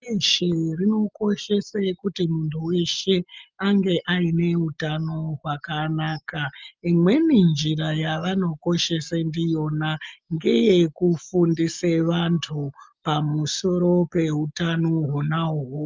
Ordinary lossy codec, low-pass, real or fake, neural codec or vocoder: Opus, 24 kbps; 7.2 kHz; real; none